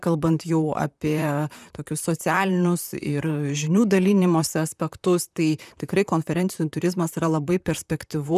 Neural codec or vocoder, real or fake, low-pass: vocoder, 44.1 kHz, 128 mel bands, Pupu-Vocoder; fake; 14.4 kHz